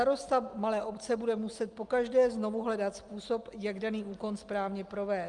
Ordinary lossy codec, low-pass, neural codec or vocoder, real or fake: Opus, 32 kbps; 10.8 kHz; none; real